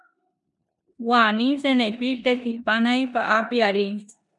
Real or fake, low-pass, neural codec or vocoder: fake; 10.8 kHz; codec, 16 kHz in and 24 kHz out, 0.9 kbps, LongCat-Audio-Codec, four codebook decoder